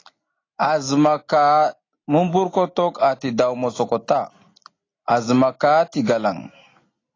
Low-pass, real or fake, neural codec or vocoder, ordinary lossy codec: 7.2 kHz; real; none; AAC, 32 kbps